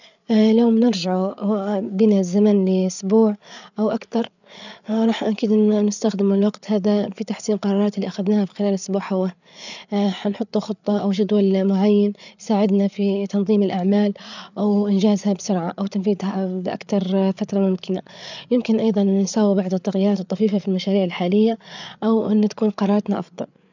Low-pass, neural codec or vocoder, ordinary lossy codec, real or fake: 7.2 kHz; codec, 16 kHz, 8 kbps, FreqCodec, larger model; none; fake